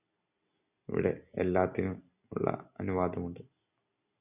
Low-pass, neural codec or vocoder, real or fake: 3.6 kHz; none; real